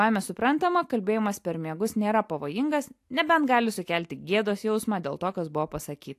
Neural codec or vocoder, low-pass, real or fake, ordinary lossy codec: none; 14.4 kHz; real; AAC, 64 kbps